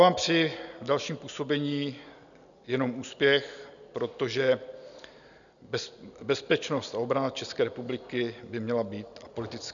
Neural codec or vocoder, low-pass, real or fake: none; 7.2 kHz; real